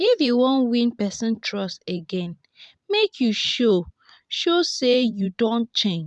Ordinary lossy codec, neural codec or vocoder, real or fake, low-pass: none; vocoder, 44.1 kHz, 128 mel bands every 512 samples, BigVGAN v2; fake; 10.8 kHz